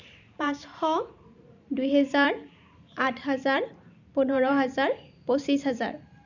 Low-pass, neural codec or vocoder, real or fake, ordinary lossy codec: 7.2 kHz; vocoder, 44.1 kHz, 128 mel bands every 512 samples, BigVGAN v2; fake; none